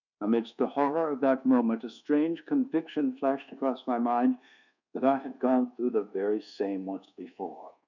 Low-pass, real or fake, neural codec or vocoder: 7.2 kHz; fake; codec, 24 kHz, 1.2 kbps, DualCodec